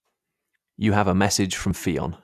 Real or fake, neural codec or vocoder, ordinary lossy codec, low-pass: real; none; none; 14.4 kHz